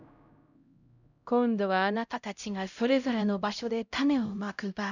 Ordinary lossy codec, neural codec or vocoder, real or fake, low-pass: none; codec, 16 kHz, 0.5 kbps, X-Codec, HuBERT features, trained on LibriSpeech; fake; 7.2 kHz